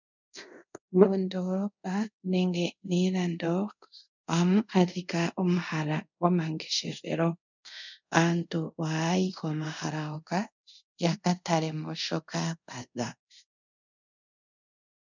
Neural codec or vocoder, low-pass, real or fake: codec, 24 kHz, 0.5 kbps, DualCodec; 7.2 kHz; fake